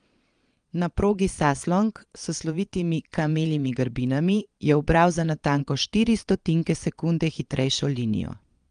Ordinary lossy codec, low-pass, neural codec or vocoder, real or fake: Opus, 32 kbps; 9.9 kHz; vocoder, 22.05 kHz, 80 mel bands, Vocos; fake